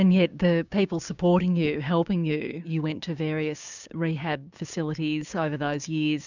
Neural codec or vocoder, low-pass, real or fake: codec, 16 kHz, 6 kbps, DAC; 7.2 kHz; fake